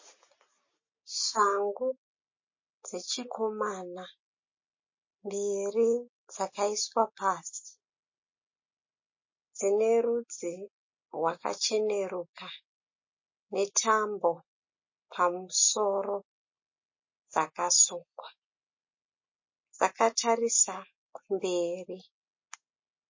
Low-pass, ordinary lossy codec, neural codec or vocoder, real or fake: 7.2 kHz; MP3, 32 kbps; none; real